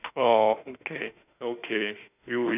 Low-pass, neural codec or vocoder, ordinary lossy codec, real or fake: 3.6 kHz; autoencoder, 48 kHz, 32 numbers a frame, DAC-VAE, trained on Japanese speech; none; fake